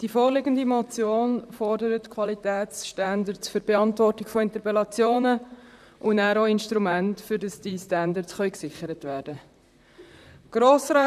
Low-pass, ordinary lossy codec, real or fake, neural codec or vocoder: 14.4 kHz; none; fake; vocoder, 44.1 kHz, 128 mel bands, Pupu-Vocoder